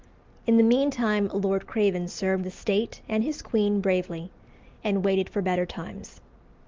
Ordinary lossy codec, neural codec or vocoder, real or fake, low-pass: Opus, 32 kbps; none; real; 7.2 kHz